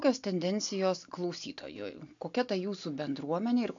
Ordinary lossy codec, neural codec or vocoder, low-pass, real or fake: AAC, 64 kbps; none; 7.2 kHz; real